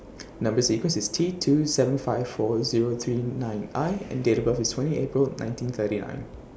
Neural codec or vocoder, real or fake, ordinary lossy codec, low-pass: none; real; none; none